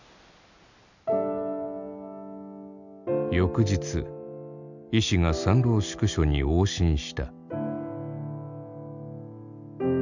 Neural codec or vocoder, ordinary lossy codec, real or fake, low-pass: none; none; real; 7.2 kHz